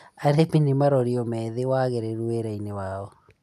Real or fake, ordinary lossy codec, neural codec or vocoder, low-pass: real; none; none; 14.4 kHz